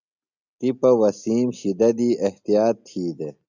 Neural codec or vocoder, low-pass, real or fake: none; 7.2 kHz; real